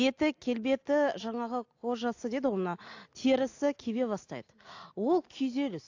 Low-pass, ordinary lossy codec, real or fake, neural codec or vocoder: 7.2 kHz; AAC, 48 kbps; real; none